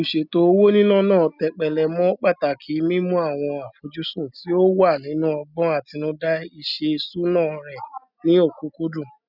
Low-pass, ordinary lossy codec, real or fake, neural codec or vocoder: 5.4 kHz; none; real; none